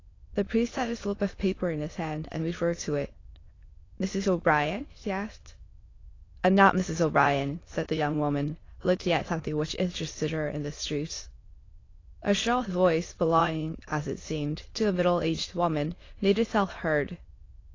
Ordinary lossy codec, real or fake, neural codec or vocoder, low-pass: AAC, 32 kbps; fake; autoencoder, 22.05 kHz, a latent of 192 numbers a frame, VITS, trained on many speakers; 7.2 kHz